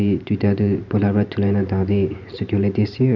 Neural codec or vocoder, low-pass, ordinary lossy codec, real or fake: vocoder, 44.1 kHz, 128 mel bands every 512 samples, BigVGAN v2; 7.2 kHz; none; fake